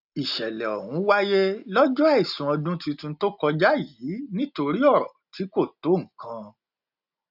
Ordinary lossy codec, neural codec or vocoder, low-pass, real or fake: none; none; 5.4 kHz; real